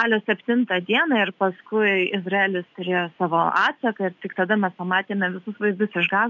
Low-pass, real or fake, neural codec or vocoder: 7.2 kHz; real; none